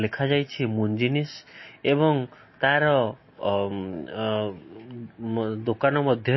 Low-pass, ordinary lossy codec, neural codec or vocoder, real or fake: 7.2 kHz; MP3, 24 kbps; none; real